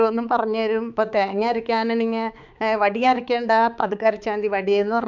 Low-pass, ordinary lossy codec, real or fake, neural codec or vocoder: 7.2 kHz; none; fake; codec, 16 kHz, 4 kbps, X-Codec, HuBERT features, trained on balanced general audio